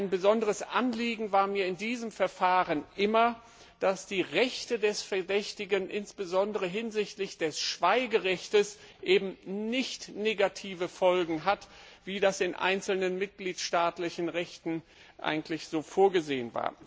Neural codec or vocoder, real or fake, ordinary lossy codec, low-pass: none; real; none; none